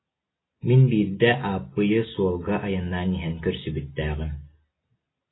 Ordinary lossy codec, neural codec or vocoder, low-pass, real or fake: AAC, 16 kbps; none; 7.2 kHz; real